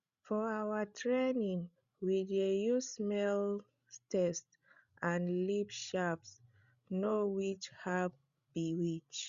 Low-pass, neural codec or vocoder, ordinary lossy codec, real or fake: 7.2 kHz; codec, 16 kHz, 16 kbps, FreqCodec, larger model; Opus, 64 kbps; fake